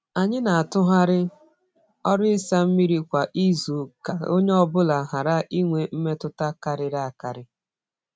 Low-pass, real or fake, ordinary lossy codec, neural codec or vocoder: none; real; none; none